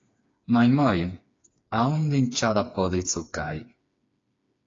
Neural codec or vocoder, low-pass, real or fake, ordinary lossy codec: codec, 16 kHz, 4 kbps, FreqCodec, smaller model; 7.2 kHz; fake; AAC, 32 kbps